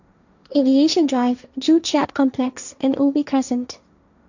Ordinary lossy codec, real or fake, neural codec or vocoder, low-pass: none; fake; codec, 16 kHz, 1.1 kbps, Voila-Tokenizer; 7.2 kHz